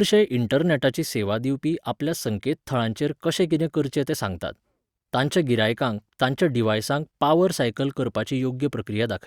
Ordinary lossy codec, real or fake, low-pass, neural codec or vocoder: Opus, 64 kbps; real; 19.8 kHz; none